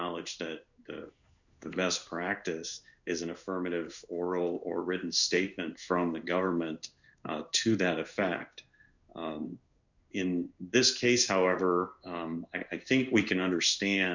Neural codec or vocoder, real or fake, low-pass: codec, 16 kHz in and 24 kHz out, 1 kbps, XY-Tokenizer; fake; 7.2 kHz